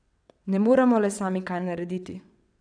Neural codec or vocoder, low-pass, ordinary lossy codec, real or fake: vocoder, 22.05 kHz, 80 mel bands, WaveNeXt; 9.9 kHz; none; fake